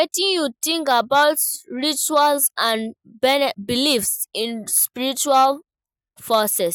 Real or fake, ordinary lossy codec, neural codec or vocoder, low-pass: real; none; none; none